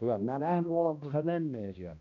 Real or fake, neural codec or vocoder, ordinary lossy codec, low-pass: fake; codec, 16 kHz, 1 kbps, X-Codec, HuBERT features, trained on balanced general audio; none; 7.2 kHz